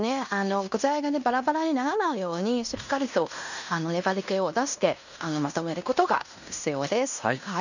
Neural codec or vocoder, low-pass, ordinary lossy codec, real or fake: codec, 16 kHz in and 24 kHz out, 0.9 kbps, LongCat-Audio-Codec, fine tuned four codebook decoder; 7.2 kHz; none; fake